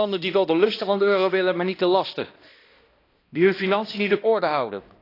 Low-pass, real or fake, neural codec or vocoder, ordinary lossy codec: 5.4 kHz; fake; codec, 16 kHz, 1 kbps, X-Codec, HuBERT features, trained on balanced general audio; AAC, 32 kbps